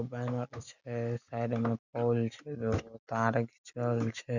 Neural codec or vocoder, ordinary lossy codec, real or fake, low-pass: none; none; real; 7.2 kHz